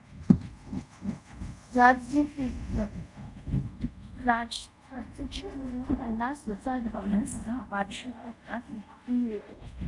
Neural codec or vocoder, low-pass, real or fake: codec, 24 kHz, 0.5 kbps, DualCodec; 10.8 kHz; fake